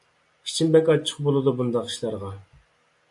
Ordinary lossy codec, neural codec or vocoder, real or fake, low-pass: MP3, 48 kbps; none; real; 10.8 kHz